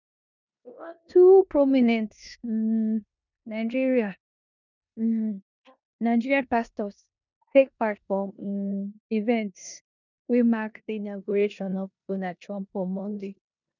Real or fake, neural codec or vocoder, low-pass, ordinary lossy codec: fake; codec, 16 kHz in and 24 kHz out, 0.9 kbps, LongCat-Audio-Codec, four codebook decoder; 7.2 kHz; none